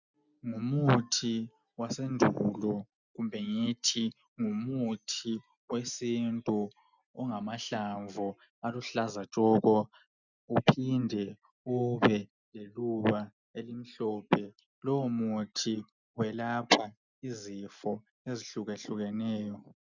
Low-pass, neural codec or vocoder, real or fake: 7.2 kHz; none; real